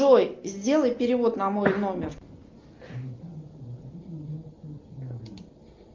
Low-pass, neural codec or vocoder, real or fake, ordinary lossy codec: 7.2 kHz; none; real; Opus, 32 kbps